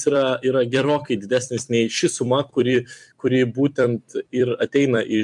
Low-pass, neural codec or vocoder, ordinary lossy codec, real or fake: 10.8 kHz; vocoder, 44.1 kHz, 128 mel bands every 256 samples, BigVGAN v2; MP3, 64 kbps; fake